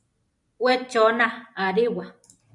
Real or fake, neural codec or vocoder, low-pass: real; none; 10.8 kHz